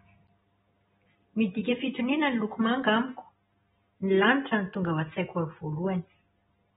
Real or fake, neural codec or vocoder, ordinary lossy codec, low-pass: real; none; AAC, 16 kbps; 19.8 kHz